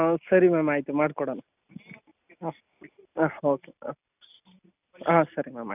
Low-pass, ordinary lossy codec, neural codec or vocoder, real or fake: 3.6 kHz; none; none; real